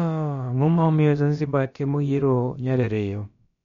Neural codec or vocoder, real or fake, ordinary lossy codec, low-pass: codec, 16 kHz, about 1 kbps, DyCAST, with the encoder's durations; fake; MP3, 48 kbps; 7.2 kHz